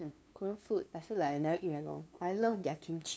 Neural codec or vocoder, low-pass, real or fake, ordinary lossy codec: codec, 16 kHz, 2 kbps, FunCodec, trained on LibriTTS, 25 frames a second; none; fake; none